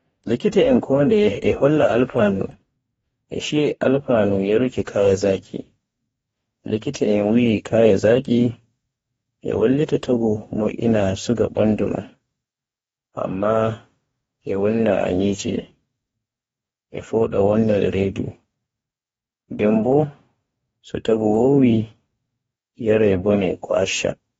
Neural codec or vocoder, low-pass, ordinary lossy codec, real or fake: codec, 44.1 kHz, 2.6 kbps, DAC; 19.8 kHz; AAC, 24 kbps; fake